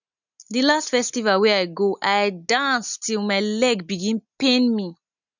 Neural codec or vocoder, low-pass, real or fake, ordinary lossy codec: none; 7.2 kHz; real; none